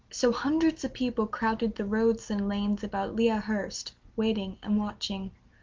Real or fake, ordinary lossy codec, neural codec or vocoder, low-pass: real; Opus, 24 kbps; none; 7.2 kHz